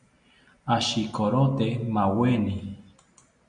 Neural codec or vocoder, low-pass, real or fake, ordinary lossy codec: none; 9.9 kHz; real; Opus, 64 kbps